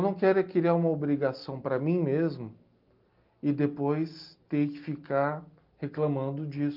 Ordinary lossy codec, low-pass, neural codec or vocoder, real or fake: Opus, 32 kbps; 5.4 kHz; none; real